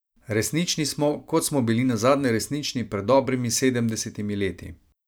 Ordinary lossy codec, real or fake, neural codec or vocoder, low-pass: none; fake; vocoder, 44.1 kHz, 128 mel bands every 512 samples, BigVGAN v2; none